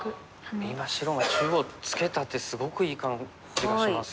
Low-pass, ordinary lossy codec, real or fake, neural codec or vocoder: none; none; real; none